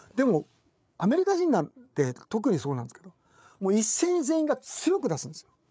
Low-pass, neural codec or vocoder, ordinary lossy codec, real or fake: none; codec, 16 kHz, 8 kbps, FreqCodec, larger model; none; fake